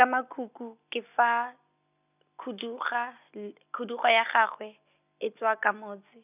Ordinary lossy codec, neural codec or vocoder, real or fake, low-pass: none; none; real; 3.6 kHz